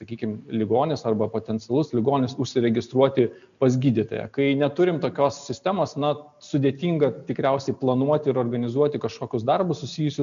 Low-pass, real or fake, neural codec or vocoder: 7.2 kHz; real; none